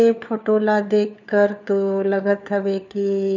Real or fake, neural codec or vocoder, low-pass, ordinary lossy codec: fake; codec, 16 kHz, 4 kbps, FunCodec, trained on LibriTTS, 50 frames a second; 7.2 kHz; none